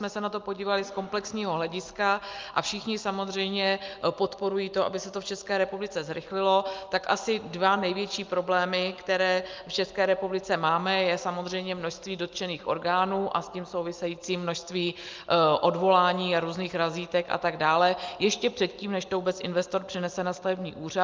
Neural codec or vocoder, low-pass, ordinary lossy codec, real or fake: none; 7.2 kHz; Opus, 24 kbps; real